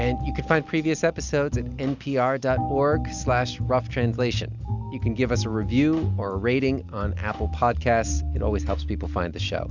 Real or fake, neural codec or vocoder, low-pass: real; none; 7.2 kHz